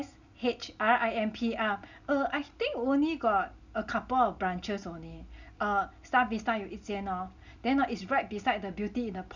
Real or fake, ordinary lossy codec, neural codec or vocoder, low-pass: real; none; none; 7.2 kHz